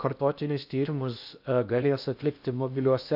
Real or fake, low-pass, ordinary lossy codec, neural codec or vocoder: fake; 5.4 kHz; AAC, 48 kbps; codec, 16 kHz in and 24 kHz out, 0.8 kbps, FocalCodec, streaming, 65536 codes